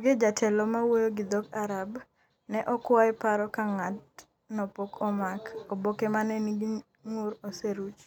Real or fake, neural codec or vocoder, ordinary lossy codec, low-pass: real; none; none; 19.8 kHz